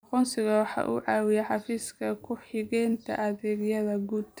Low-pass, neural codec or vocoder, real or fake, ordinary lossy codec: none; vocoder, 44.1 kHz, 128 mel bands every 256 samples, BigVGAN v2; fake; none